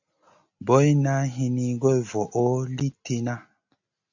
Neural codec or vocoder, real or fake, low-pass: none; real; 7.2 kHz